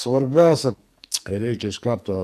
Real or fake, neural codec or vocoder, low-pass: fake; codec, 44.1 kHz, 2.6 kbps, SNAC; 14.4 kHz